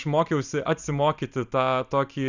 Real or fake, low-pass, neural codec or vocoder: fake; 7.2 kHz; vocoder, 44.1 kHz, 128 mel bands every 256 samples, BigVGAN v2